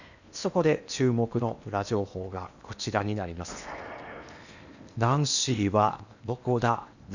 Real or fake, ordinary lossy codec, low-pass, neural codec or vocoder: fake; none; 7.2 kHz; codec, 16 kHz in and 24 kHz out, 0.8 kbps, FocalCodec, streaming, 65536 codes